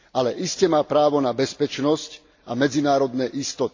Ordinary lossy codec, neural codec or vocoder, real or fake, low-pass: AAC, 48 kbps; none; real; 7.2 kHz